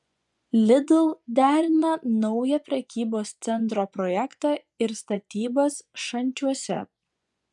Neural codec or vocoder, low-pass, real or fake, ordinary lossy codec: vocoder, 24 kHz, 100 mel bands, Vocos; 10.8 kHz; fake; MP3, 96 kbps